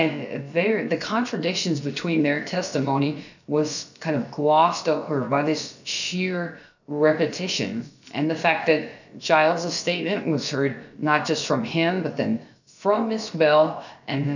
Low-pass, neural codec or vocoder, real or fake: 7.2 kHz; codec, 16 kHz, about 1 kbps, DyCAST, with the encoder's durations; fake